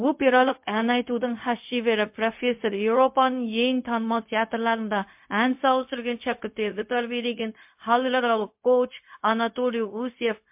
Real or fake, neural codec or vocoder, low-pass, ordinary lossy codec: fake; codec, 16 kHz, 0.4 kbps, LongCat-Audio-Codec; 3.6 kHz; MP3, 32 kbps